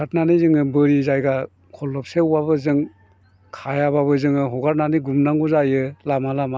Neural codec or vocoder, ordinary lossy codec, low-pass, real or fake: none; none; none; real